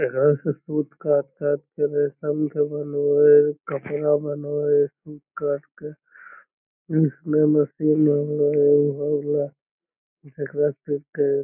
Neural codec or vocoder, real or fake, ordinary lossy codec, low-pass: none; real; none; 3.6 kHz